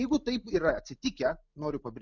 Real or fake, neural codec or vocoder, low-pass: real; none; 7.2 kHz